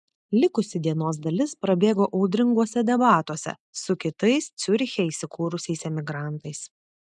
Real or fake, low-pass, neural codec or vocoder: real; 10.8 kHz; none